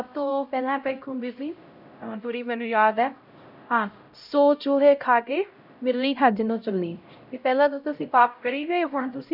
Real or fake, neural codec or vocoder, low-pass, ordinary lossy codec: fake; codec, 16 kHz, 0.5 kbps, X-Codec, HuBERT features, trained on LibriSpeech; 5.4 kHz; none